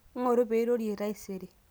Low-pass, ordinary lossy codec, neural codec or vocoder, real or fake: none; none; none; real